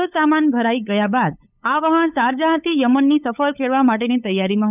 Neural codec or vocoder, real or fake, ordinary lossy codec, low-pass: codec, 16 kHz, 8 kbps, FunCodec, trained on LibriTTS, 25 frames a second; fake; none; 3.6 kHz